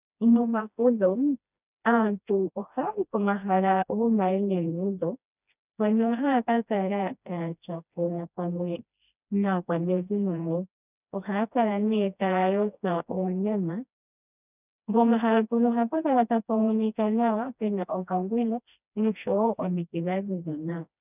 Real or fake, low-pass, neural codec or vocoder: fake; 3.6 kHz; codec, 16 kHz, 1 kbps, FreqCodec, smaller model